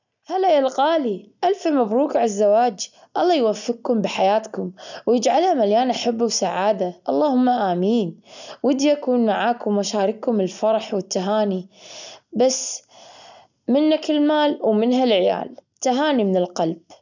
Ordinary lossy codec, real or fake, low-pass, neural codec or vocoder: none; real; 7.2 kHz; none